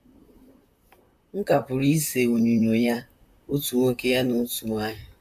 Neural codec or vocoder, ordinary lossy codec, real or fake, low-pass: vocoder, 44.1 kHz, 128 mel bands, Pupu-Vocoder; none; fake; 14.4 kHz